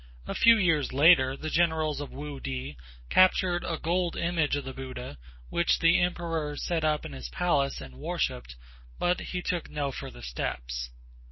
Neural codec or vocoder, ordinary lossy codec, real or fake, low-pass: none; MP3, 24 kbps; real; 7.2 kHz